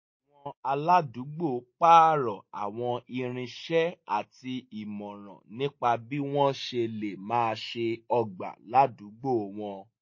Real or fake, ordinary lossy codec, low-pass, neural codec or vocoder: real; MP3, 32 kbps; 7.2 kHz; none